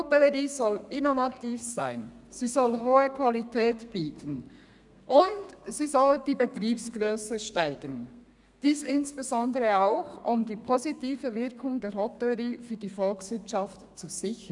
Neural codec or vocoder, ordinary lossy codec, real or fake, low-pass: codec, 32 kHz, 1.9 kbps, SNAC; none; fake; 10.8 kHz